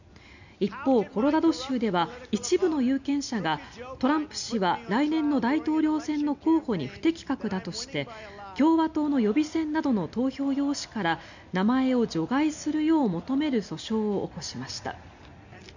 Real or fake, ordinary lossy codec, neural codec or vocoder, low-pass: real; none; none; 7.2 kHz